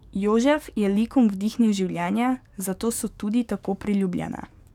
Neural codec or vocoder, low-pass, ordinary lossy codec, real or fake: codec, 44.1 kHz, 7.8 kbps, DAC; 19.8 kHz; none; fake